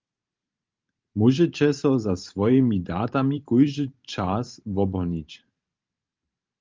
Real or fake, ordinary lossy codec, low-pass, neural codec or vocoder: fake; Opus, 32 kbps; 7.2 kHz; vocoder, 44.1 kHz, 128 mel bands every 512 samples, BigVGAN v2